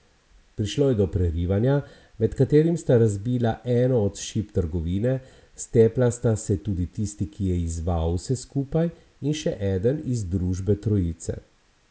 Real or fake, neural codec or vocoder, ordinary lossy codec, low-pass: real; none; none; none